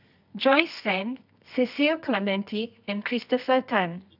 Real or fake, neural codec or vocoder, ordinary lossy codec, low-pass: fake; codec, 24 kHz, 0.9 kbps, WavTokenizer, medium music audio release; none; 5.4 kHz